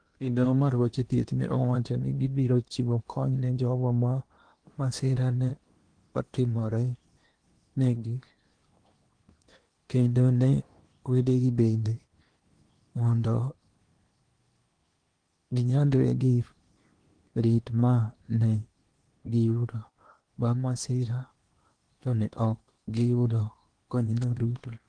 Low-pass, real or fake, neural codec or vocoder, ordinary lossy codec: 9.9 kHz; fake; codec, 16 kHz in and 24 kHz out, 0.8 kbps, FocalCodec, streaming, 65536 codes; Opus, 24 kbps